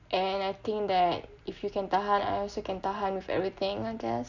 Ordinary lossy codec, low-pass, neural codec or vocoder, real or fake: none; 7.2 kHz; none; real